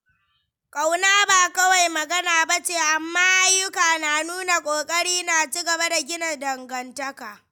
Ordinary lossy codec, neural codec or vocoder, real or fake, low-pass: none; none; real; none